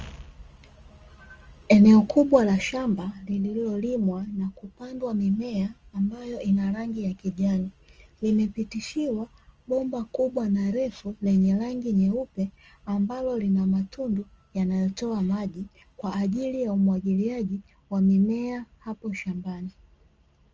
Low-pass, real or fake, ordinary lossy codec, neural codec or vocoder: 7.2 kHz; real; Opus, 24 kbps; none